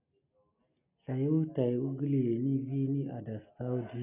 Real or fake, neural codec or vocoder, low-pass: real; none; 3.6 kHz